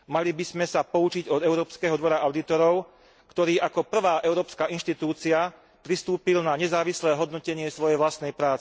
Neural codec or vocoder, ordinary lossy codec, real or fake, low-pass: none; none; real; none